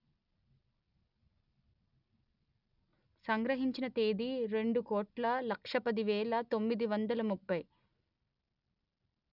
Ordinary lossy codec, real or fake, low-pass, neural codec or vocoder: none; real; 5.4 kHz; none